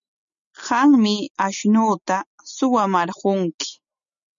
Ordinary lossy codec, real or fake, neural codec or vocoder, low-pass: MP3, 96 kbps; real; none; 7.2 kHz